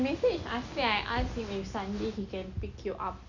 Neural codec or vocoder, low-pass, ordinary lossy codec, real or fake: none; 7.2 kHz; none; real